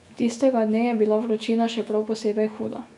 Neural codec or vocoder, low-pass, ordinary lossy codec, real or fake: autoencoder, 48 kHz, 128 numbers a frame, DAC-VAE, trained on Japanese speech; 10.8 kHz; none; fake